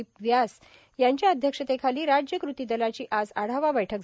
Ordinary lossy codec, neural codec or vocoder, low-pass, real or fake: none; none; none; real